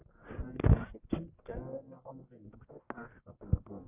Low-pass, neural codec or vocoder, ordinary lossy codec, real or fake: 3.6 kHz; codec, 44.1 kHz, 1.7 kbps, Pupu-Codec; Opus, 64 kbps; fake